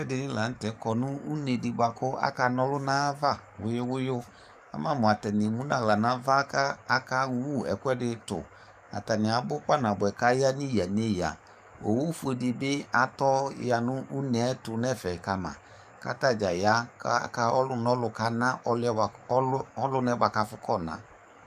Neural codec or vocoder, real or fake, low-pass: codec, 44.1 kHz, 7.8 kbps, DAC; fake; 14.4 kHz